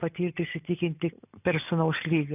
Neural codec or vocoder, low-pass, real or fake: none; 3.6 kHz; real